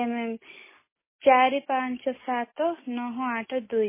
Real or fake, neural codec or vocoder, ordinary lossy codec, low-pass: real; none; MP3, 16 kbps; 3.6 kHz